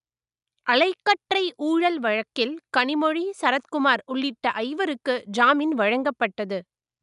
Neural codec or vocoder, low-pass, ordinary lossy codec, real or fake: none; 10.8 kHz; none; real